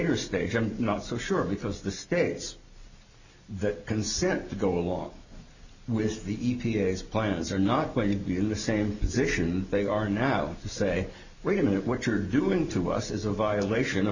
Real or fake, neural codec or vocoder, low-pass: real; none; 7.2 kHz